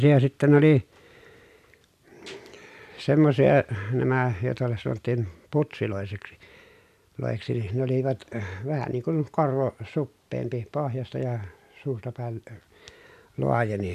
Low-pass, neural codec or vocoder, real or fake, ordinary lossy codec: 14.4 kHz; none; real; none